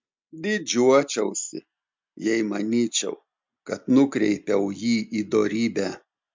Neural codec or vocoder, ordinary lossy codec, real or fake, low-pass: none; MP3, 64 kbps; real; 7.2 kHz